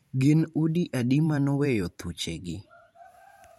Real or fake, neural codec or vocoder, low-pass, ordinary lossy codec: fake; vocoder, 44.1 kHz, 128 mel bands every 512 samples, BigVGAN v2; 19.8 kHz; MP3, 64 kbps